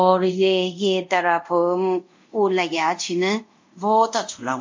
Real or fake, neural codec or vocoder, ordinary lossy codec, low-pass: fake; codec, 24 kHz, 0.5 kbps, DualCodec; MP3, 48 kbps; 7.2 kHz